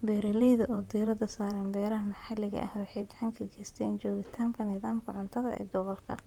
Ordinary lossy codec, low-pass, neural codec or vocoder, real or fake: Opus, 32 kbps; 19.8 kHz; vocoder, 44.1 kHz, 128 mel bands, Pupu-Vocoder; fake